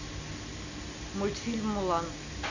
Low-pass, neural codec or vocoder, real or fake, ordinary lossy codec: 7.2 kHz; none; real; none